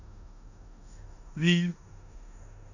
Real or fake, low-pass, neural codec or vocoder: fake; 7.2 kHz; codec, 16 kHz in and 24 kHz out, 0.9 kbps, LongCat-Audio-Codec, four codebook decoder